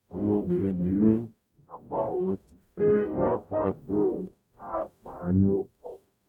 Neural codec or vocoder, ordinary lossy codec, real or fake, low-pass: codec, 44.1 kHz, 0.9 kbps, DAC; none; fake; 19.8 kHz